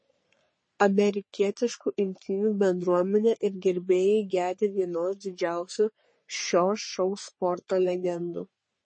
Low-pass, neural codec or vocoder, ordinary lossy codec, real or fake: 9.9 kHz; codec, 44.1 kHz, 3.4 kbps, Pupu-Codec; MP3, 32 kbps; fake